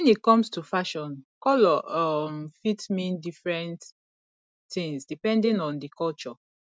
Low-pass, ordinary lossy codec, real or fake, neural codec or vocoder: none; none; real; none